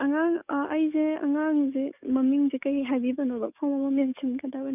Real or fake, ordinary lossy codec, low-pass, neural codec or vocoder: fake; none; 3.6 kHz; codec, 44.1 kHz, 7.8 kbps, DAC